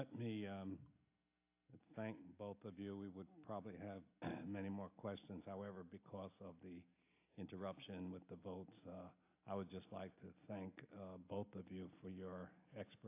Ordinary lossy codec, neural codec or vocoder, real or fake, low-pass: AAC, 24 kbps; none; real; 3.6 kHz